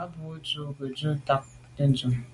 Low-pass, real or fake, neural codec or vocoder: 10.8 kHz; real; none